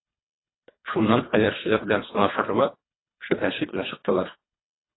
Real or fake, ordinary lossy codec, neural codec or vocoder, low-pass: fake; AAC, 16 kbps; codec, 24 kHz, 1.5 kbps, HILCodec; 7.2 kHz